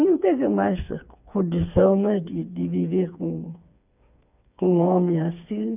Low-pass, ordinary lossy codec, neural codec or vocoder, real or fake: 3.6 kHz; none; codec, 16 kHz, 4 kbps, FreqCodec, smaller model; fake